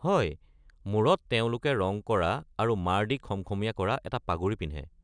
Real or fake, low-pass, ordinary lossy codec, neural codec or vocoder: real; 9.9 kHz; none; none